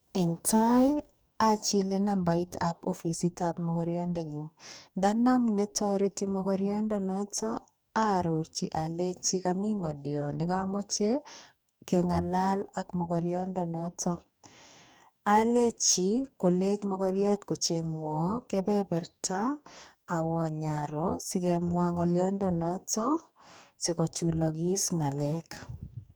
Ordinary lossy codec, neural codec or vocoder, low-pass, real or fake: none; codec, 44.1 kHz, 2.6 kbps, DAC; none; fake